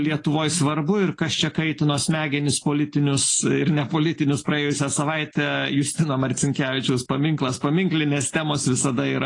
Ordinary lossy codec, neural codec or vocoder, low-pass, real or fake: AAC, 32 kbps; none; 10.8 kHz; real